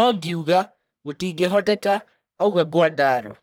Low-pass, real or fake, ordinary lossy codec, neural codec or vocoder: none; fake; none; codec, 44.1 kHz, 1.7 kbps, Pupu-Codec